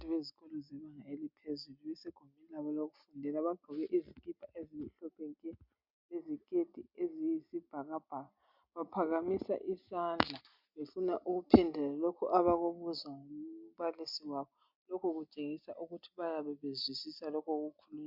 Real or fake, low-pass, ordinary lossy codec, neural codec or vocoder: real; 5.4 kHz; MP3, 48 kbps; none